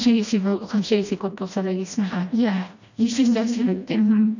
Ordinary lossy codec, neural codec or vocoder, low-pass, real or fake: none; codec, 16 kHz, 1 kbps, FreqCodec, smaller model; 7.2 kHz; fake